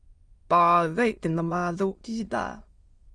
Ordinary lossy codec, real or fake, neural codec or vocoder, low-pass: Opus, 32 kbps; fake; autoencoder, 22.05 kHz, a latent of 192 numbers a frame, VITS, trained on many speakers; 9.9 kHz